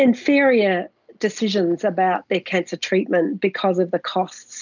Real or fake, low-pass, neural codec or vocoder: real; 7.2 kHz; none